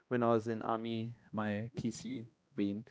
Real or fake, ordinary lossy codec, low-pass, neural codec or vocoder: fake; none; none; codec, 16 kHz, 1 kbps, X-Codec, HuBERT features, trained on balanced general audio